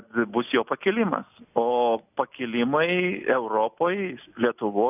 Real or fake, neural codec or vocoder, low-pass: real; none; 3.6 kHz